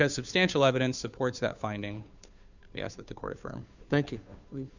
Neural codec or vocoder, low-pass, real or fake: codec, 16 kHz, 2 kbps, FunCodec, trained on Chinese and English, 25 frames a second; 7.2 kHz; fake